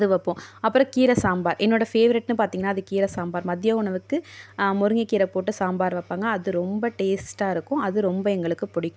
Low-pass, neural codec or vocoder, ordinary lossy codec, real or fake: none; none; none; real